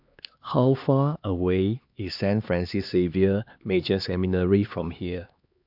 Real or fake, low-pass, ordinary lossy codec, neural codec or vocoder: fake; 5.4 kHz; AAC, 48 kbps; codec, 16 kHz, 2 kbps, X-Codec, HuBERT features, trained on LibriSpeech